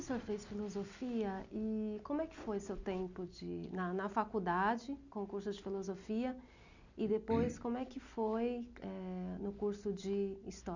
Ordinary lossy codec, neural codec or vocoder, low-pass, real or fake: none; none; 7.2 kHz; real